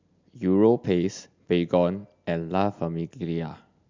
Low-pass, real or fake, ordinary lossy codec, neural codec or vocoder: 7.2 kHz; real; MP3, 64 kbps; none